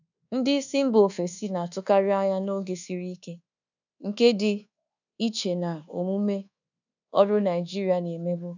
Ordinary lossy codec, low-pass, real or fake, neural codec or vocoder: none; 7.2 kHz; fake; codec, 24 kHz, 1.2 kbps, DualCodec